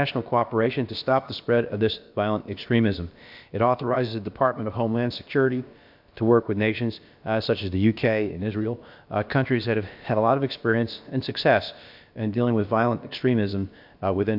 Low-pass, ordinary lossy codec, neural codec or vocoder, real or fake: 5.4 kHz; MP3, 48 kbps; codec, 16 kHz, about 1 kbps, DyCAST, with the encoder's durations; fake